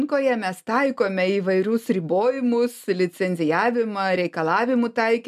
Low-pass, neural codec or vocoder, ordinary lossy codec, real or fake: 14.4 kHz; none; MP3, 96 kbps; real